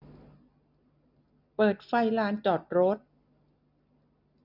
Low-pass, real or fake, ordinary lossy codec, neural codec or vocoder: 5.4 kHz; real; none; none